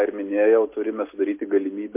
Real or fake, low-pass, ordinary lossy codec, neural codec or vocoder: real; 3.6 kHz; MP3, 24 kbps; none